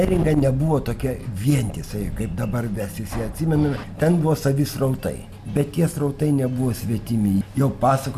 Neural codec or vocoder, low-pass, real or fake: none; 14.4 kHz; real